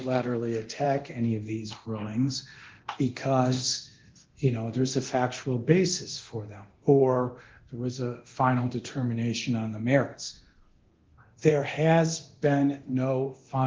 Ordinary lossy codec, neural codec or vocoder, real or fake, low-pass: Opus, 16 kbps; codec, 24 kHz, 0.9 kbps, DualCodec; fake; 7.2 kHz